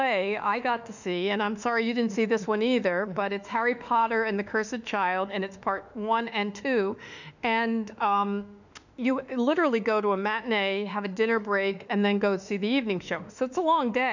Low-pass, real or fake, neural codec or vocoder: 7.2 kHz; fake; autoencoder, 48 kHz, 32 numbers a frame, DAC-VAE, trained on Japanese speech